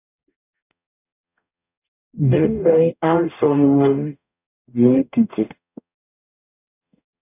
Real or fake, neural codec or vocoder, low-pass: fake; codec, 44.1 kHz, 0.9 kbps, DAC; 3.6 kHz